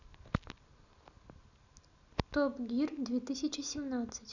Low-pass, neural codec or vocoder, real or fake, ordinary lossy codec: 7.2 kHz; none; real; none